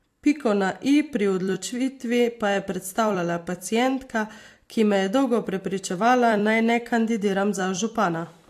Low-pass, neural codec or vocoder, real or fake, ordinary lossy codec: 14.4 kHz; vocoder, 44.1 kHz, 128 mel bands every 256 samples, BigVGAN v2; fake; AAC, 64 kbps